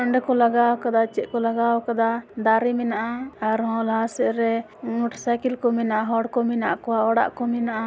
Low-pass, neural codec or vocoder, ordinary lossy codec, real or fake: none; none; none; real